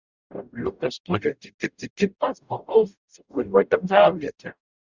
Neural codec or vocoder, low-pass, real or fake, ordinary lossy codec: codec, 44.1 kHz, 0.9 kbps, DAC; 7.2 kHz; fake; Opus, 64 kbps